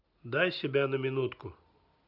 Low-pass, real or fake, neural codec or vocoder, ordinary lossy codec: 5.4 kHz; real; none; none